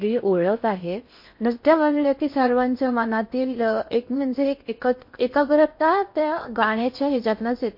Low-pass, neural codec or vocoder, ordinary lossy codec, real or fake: 5.4 kHz; codec, 16 kHz in and 24 kHz out, 0.6 kbps, FocalCodec, streaming, 4096 codes; MP3, 32 kbps; fake